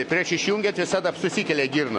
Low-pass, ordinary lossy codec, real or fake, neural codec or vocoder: 10.8 kHz; MP3, 48 kbps; real; none